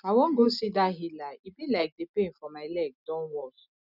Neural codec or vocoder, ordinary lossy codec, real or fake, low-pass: none; none; real; 5.4 kHz